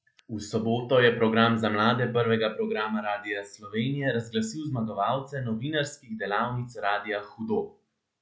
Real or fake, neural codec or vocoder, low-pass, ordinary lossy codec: real; none; none; none